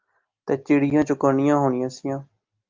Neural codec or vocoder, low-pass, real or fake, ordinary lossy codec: none; 7.2 kHz; real; Opus, 24 kbps